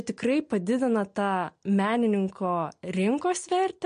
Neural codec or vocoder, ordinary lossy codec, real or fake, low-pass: none; MP3, 48 kbps; real; 9.9 kHz